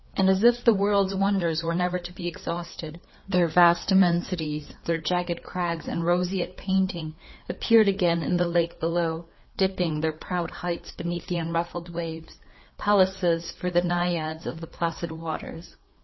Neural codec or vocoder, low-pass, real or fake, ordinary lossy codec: codec, 16 kHz, 4 kbps, FreqCodec, larger model; 7.2 kHz; fake; MP3, 24 kbps